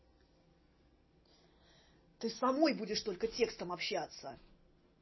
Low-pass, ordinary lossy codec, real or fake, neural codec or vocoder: 7.2 kHz; MP3, 24 kbps; real; none